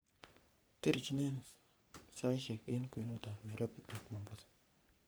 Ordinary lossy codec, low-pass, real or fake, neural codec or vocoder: none; none; fake; codec, 44.1 kHz, 3.4 kbps, Pupu-Codec